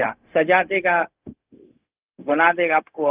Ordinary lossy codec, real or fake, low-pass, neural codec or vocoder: Opus, 32 kbps; fake; 3.6 kHz; codec, 16 kHz, 0.4 kbps, LongCat-Audio-Codec